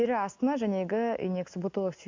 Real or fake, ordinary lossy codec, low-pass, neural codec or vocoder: real; MP3, 64 kbps; 7.2 kHz; none